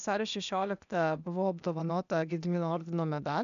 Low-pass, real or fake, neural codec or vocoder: 7.2 kHz; fake; codec, 16 kHz, 0.8 kbps, ZipCodec